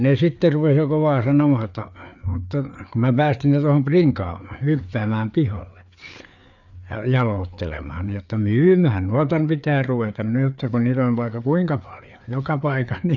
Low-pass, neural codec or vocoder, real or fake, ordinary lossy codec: 7.2 kHz; codec, 16 kHz, 4 kbps, FreqCodec, larger model; fake; none